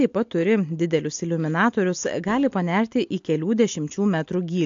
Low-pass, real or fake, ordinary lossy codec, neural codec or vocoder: 7.2 kHz; real; AAC, 64 kbps; none